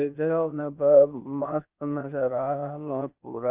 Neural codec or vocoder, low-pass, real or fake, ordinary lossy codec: codec, 16 kHz, 0.8 kbps, ZipCodec; 3.6 kHz; fake; Opus, 24 kbps